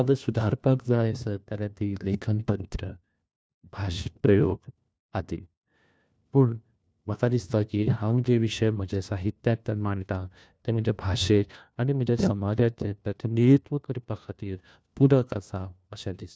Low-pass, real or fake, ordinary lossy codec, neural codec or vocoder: none; fake; none; codec, 16 kHz, 1 kbps, FunCodec, trained on LibriTTS, 50 frames a second